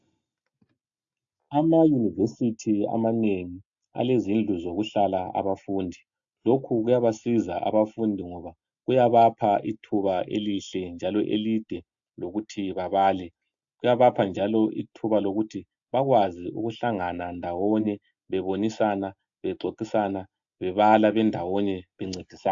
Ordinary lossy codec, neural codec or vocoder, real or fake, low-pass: AAC, 64 kbps; none; real; 7.2 kHz